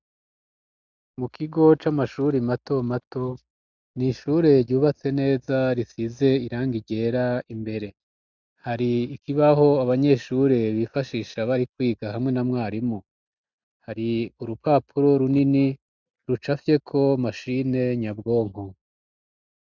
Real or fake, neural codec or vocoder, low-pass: real; none; 7.2 kHz